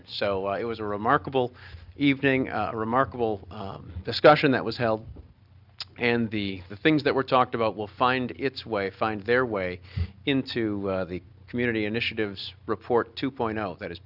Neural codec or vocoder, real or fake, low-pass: none; real; 5.4 kHz